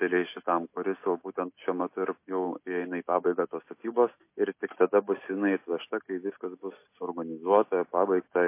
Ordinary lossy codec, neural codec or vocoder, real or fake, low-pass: MP3, 24 kbps; none; real; 3.6 kHz